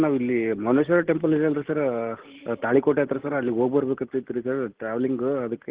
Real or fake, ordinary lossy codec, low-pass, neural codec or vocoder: real; Opus, 16 kbps; 3.6 kHz; none